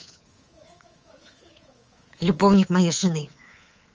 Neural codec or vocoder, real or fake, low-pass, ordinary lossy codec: codec, 24 kHz, 3.1 kbps, DualCodec; fake; 7.2 kHz; Opus, 24 kbps